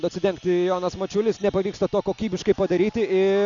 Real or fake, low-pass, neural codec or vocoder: real; 7.2 kHz; none